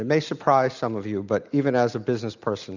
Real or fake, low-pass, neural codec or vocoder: real; 7.2 kHz; none